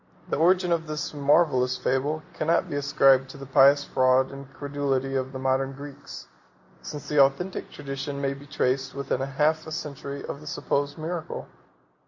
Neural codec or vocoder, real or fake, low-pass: none; real; 7.2 kHz